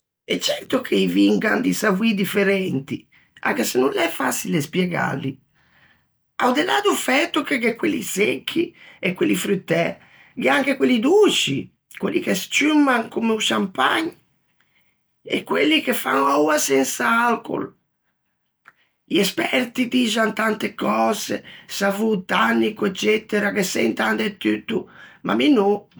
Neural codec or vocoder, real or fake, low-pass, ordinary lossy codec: none; real; none; none